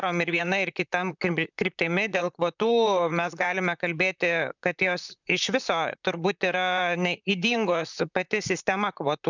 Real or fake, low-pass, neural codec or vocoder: fake; 7.2 kHz; vocoder, 44.1 kHz, 128 mel bands, Pupu-Vocoder